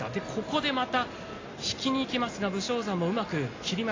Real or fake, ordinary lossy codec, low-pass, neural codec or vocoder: real; AAC, 32 kbps; 7.2 kHz; none